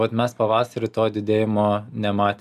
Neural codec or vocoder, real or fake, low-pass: none; real; 14.4 kHz